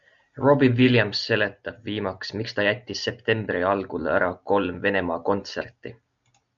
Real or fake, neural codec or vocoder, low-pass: real; none; 7.2 kHz